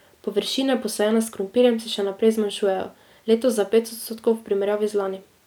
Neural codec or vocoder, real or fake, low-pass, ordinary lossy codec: none; real; none; none